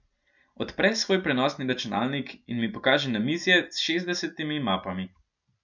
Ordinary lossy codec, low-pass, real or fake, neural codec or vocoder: none; 7.2 kHz; real; none